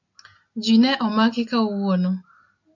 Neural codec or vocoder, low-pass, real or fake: none; 7.2 kHz; real